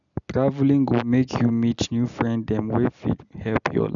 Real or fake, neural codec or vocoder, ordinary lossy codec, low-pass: real; none; none; 7.2 kHz